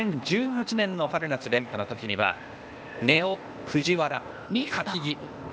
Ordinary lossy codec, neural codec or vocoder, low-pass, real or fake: none; codec, 16 kHz, 0.8 kbps, ZipCodec; none; fake